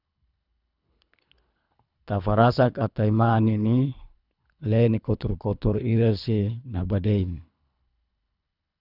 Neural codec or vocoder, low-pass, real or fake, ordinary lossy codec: codec, 24 kHz, 3 kbps, HILCodec; 5.4 kHz; fake; none